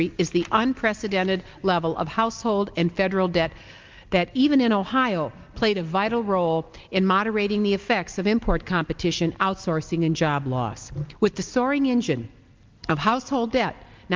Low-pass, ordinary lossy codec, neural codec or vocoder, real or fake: 7.2 kHz; Opus, 32 kbps; none; real